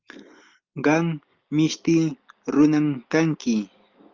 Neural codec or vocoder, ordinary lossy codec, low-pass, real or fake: none; Opus, 24 kbps; 7.2 kHz; real